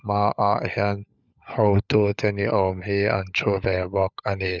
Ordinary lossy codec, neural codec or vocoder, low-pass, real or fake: none; codec, 16 kHz, 16 kbps, FunCodec, trained on LibriTTS, 50 frames a second; 7.2 kHz; fake